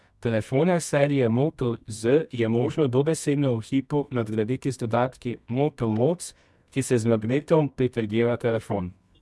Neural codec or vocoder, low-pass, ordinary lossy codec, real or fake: codec, 24 kHz, 0.9 kbps, WavTokenizer, medium music audio release; none; none; fake